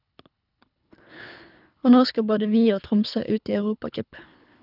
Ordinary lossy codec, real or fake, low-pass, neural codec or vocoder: none; fake; 5.4 kHz; codec, 24 kHz, 3 kbps, HILCodec